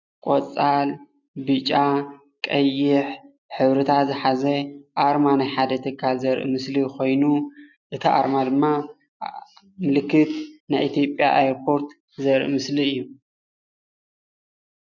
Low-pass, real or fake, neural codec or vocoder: 7.2 kHz; real; none